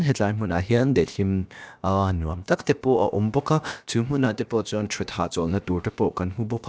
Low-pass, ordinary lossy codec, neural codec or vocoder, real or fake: none; none; codec, 16 kHz, about 1 kbps, DyCAST, with the encoder's durations; fake